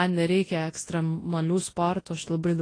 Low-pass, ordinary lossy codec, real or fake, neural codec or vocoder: 9.9 kHz; AAC, 32 kbps; fake; codec, 24 kHz, 0.9 kbps, WavTokenizer, large speech release